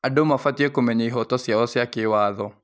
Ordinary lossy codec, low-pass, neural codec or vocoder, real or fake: none; none; none; real